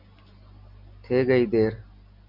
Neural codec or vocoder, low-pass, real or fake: none; 5.4 kHz; real